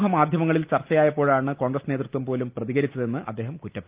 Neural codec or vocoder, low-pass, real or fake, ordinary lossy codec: none; 3.6 kHz; real; Opus, 32 kbps